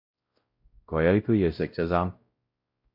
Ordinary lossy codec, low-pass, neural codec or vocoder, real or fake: MP3, 32 kbps; 5.4 kHz; codec, 16 kHz, 0.5 kbps, X-Codec, WavLM features, trained on Multilingual LibriSpeech; fake